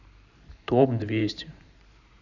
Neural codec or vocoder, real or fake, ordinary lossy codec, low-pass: vocoder, 44.1 kHz, 128 mel bands every 512 samples, BigVGAN v2; fake; none; 7.2 kHz